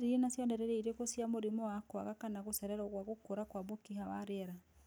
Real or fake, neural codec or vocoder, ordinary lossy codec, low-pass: real; none; none; none